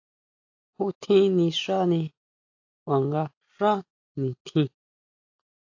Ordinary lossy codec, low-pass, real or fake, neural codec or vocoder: AAC, 48 kbps; 7.2 kHz; real; none